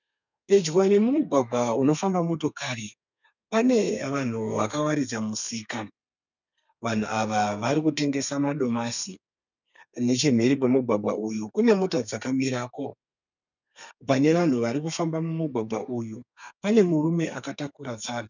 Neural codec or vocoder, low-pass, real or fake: codec, 32 kHz, 1.9 kbps, SNAC; 7.2 kHz; fake